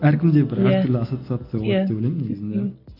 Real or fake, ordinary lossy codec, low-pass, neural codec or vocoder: real; AAC, 32 kbps; 5.4 kHz; none